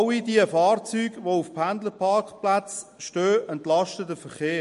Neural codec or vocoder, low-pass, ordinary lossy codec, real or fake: none; 14.4 kHz; MP3, 48 kbps; real